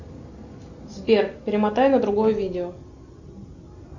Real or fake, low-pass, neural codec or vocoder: real; 7.2 kHz; none